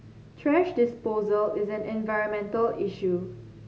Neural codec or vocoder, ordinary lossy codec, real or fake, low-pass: none; none; real; none